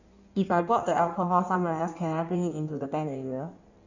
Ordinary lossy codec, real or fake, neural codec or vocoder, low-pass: none; fake; codec, 16 kHz in and 24 kHz out, 1.1 kbps, FireRedTTS-2 codec; 7.2 kHz